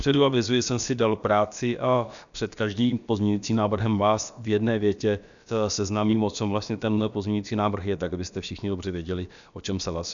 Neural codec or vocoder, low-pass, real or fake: codec, 16 kHz, about 1 kbps, DyCAST, with the encoder's durations; 7.2 kHz; fake